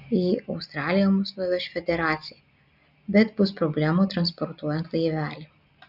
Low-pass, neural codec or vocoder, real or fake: 5.4 kHz; none; real